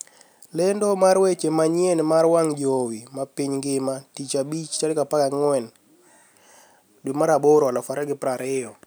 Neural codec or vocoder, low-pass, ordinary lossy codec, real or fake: none; none; none; real